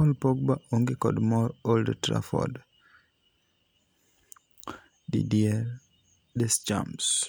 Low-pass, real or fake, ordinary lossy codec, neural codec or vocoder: none; real; none; none